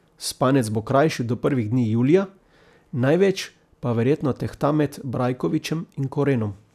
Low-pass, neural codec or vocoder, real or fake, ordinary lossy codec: 14.4 kHz; none; real; none